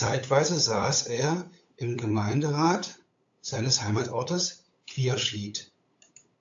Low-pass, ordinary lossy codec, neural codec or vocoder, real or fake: 7.2 kHz; AAC, 48 kbps; codec, 16 kHz, 8 kbps, FunCodec, trained on LibriTTS, 25 frames a second; fake